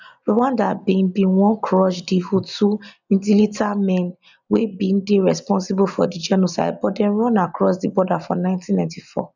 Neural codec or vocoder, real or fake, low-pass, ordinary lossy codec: none; real; 7.2 kHz; none